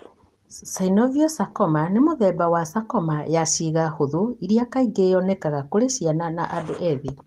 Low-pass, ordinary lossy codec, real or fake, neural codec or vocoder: 14.4 kHz; Opus, 24 kbps; real; none